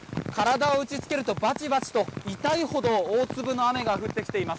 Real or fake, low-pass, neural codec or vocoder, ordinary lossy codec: real; none; none; none